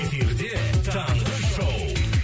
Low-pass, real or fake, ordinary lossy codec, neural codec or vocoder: none; real; none; none